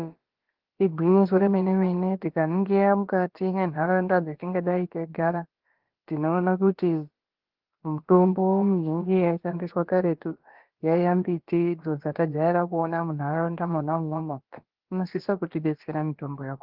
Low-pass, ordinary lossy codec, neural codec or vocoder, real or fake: 5.4 kHz; Opus, 16 kbps; codec, 16 kHz, about 1 kbps, DyCAST, with the encoder's durations; fake